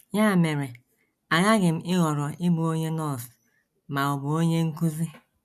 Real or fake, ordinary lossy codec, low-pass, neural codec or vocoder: real; none; 14.4 kHz; none